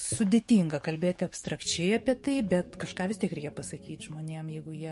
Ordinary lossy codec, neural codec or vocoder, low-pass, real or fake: MP3, 48 kbps; codec, 44.1 kHz, 7.8 kbps, DAC; 14.4 kHz; fake